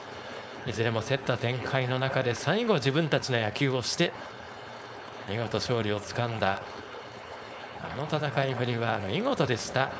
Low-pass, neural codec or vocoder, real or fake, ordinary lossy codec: none; codec, 16 kHz, 4.8 kbps, FACodec; fake; none